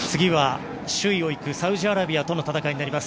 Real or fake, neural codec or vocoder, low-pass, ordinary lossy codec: real; none; none; none